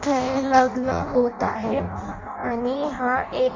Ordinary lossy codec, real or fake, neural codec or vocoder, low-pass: MP3, 48 kbps; fake; codec, 16 kHz in and 24 kHz out, 0.6 kbps, FireRedTTS-2 codec; 7.2 kHz